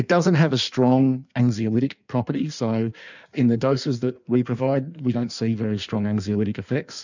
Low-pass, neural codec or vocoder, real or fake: 7.2 kHz; codec, 16 kHz in and 24 kHz out, 1.1 kbps, FireRedTTS-2 codec; fake